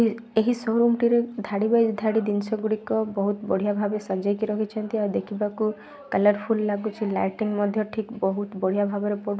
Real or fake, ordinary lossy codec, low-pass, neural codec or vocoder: real; none; none; none